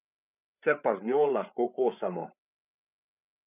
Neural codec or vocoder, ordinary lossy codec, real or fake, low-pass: codec, 16 kHz, 8 kbps, FreqCodec, larger model; none; fake; 3.6 kHz